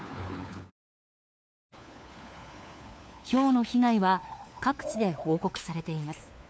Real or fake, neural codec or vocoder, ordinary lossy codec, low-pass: fake; codec, 16 kHz, 4 kbps, FunCodec, trained on LibriTTS, 50 frames a second; none; none